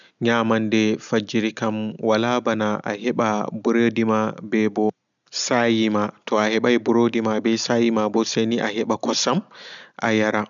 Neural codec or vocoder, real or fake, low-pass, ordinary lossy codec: none; real; 7.2 kHz; none